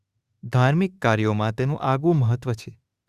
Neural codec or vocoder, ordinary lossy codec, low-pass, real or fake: autoencoder, 48 kHz, 32 numbers a frame, DAC-VAE, trained on Japanese speech; Opus, 64 kbps; 14.4 kHz; fake